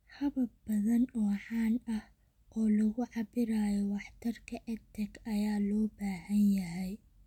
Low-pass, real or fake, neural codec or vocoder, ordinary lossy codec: 19.8 kHz; real; none; none